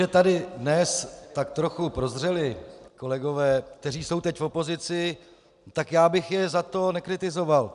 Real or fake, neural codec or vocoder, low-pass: real; none; 10.8 kHz